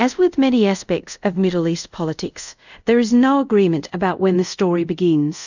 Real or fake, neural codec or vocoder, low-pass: fake; codec, 24 kHz, 0.5 kbps, DualCodec; 7.2 kHz